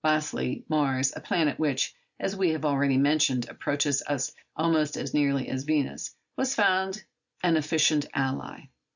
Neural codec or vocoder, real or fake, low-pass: none; real; 7.2 kHz